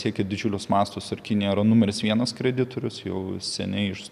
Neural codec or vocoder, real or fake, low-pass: none; real; 14.4 kHz